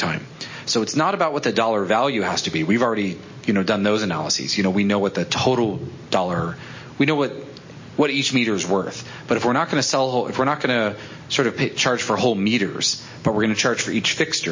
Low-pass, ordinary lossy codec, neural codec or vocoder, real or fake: 7.2 kHz; MP3, 32 kbps; none; real